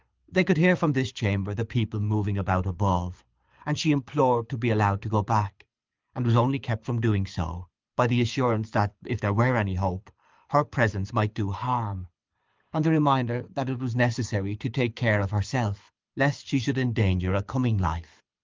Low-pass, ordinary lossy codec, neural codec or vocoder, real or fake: 7.2 kHz; Opus, 24 kbps; codec, 24 kHz, 6 kbps, HILCodec; fake